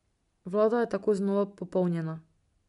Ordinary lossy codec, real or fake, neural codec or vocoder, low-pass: MP3, 64 kbps; real; none; 10.8 kHz